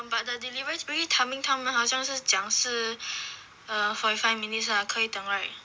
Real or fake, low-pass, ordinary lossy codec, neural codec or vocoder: real; none; none; none